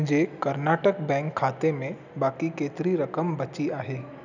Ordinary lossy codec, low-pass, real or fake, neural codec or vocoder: none; 7.2 kHz; real; none